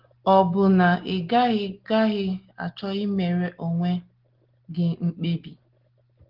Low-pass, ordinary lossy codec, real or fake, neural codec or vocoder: 5.4 kHz; Opus, 16 kbps; real; none